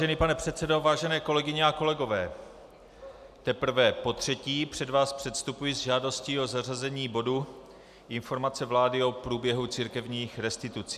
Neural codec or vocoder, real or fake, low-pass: none; real; 14.4 kHz